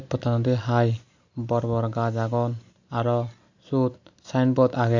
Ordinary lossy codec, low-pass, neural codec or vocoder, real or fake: Opus, 64 kbps; 7.2 kHz; none; real